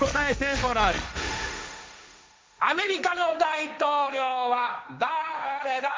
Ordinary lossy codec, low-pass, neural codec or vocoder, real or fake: none; none; codec, 16 kHz, 1.1 kbps, Voila-Tokenizer; fake